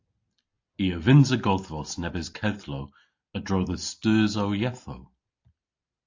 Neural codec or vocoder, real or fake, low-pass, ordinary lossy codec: none; real; 7.2 kHz; AAC, 48 kbps